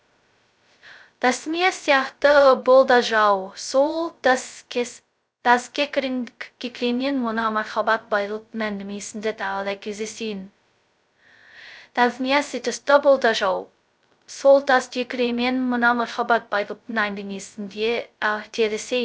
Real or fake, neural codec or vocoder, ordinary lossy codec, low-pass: fake; codec, 16 kHz, 0.2 kbps, FocalCodec; none; none